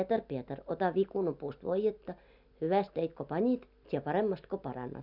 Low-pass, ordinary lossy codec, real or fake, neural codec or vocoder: 5.4 kHz; none; real; none